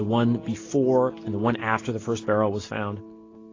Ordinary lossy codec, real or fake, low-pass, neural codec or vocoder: AAC, 32 kbps; real; 7.2 kHz; none